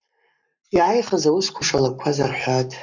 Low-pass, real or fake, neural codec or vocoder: 7.2 kHz; fake; codec, 44.1 kHz, 7.8 kbps, Pupu-Codec